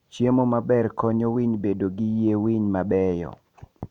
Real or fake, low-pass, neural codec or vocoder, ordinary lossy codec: real; 19.8 kHz; none; none